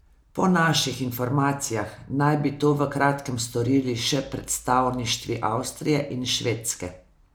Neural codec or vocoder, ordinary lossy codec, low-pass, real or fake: none; none; none; real